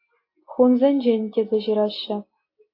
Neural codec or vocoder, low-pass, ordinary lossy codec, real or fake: none; 5.4 kHz; AAC, 32 kbps; real